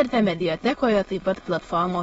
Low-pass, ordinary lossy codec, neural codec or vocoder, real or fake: 9.9 kHz; AAC, 24 kbps; autoencoder, 22.05 kHz, a latent of 192 numbers a frame, VITS, trained on many speakers; fake